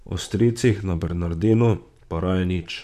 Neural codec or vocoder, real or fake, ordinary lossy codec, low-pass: vocoder, 44.1 kHz, 128 mel bands, Pupu-Vocoder; fake; none; 14.4 kHz